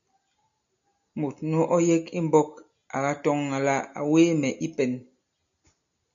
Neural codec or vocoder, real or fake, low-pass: none; real; 7.2 kHz